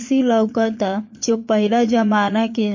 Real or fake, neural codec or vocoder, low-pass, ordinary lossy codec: fake; vocoder, 22.05 kHz, 80 mel bands, Vocos; 7.2 kHz; MP3, 32 kbps